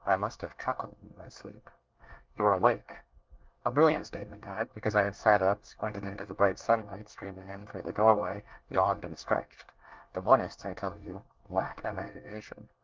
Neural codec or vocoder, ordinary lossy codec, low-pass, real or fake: codec, 24 kHz, 1 kbps, SNAC; Opus, 32 kbps; 7.2 kHz; fake